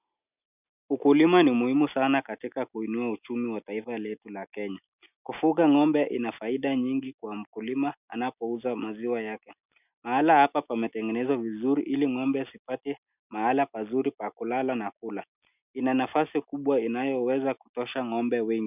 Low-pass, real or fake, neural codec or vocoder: 3.6 kHz; real; none